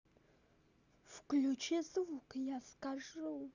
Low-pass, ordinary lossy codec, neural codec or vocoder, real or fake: 7.2 kHz; none; none; real